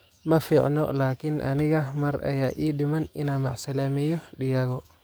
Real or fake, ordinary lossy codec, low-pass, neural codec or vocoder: fake; none; none; codec, 44.1 kHz, 7.8 kbps, DAC